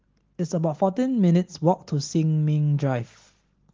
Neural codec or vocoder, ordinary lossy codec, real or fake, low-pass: none; Opus, 16 kbps; real; 7.2 kHz